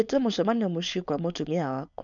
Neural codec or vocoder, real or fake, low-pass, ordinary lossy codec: codec, 16 kHz, 4.8 kbps, FACodec; fake; 7.2 kHz; none